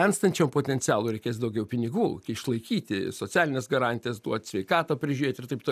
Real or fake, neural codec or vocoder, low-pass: real; none; 14.4 kHz